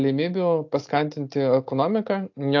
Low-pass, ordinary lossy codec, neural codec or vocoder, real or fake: 7.2 kHz; AAC, 48 kbps; none; real